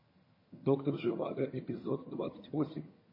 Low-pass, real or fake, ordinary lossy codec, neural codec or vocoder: 5.4 kHz; fake; MP3, 24 kbps; vocoder, 22.05 kHz, 80 mel bands, HiFi-GAN